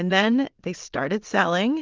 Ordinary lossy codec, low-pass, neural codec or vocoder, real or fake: Opus, 32 kbps; 7.2 kHz; vocoder, 22.05 kHz, 80 mel bands, WaveNeXt; fake